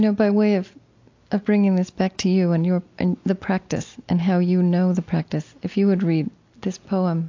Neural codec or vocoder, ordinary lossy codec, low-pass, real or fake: none; AAC, 48 kbps; 7.2 kHz; real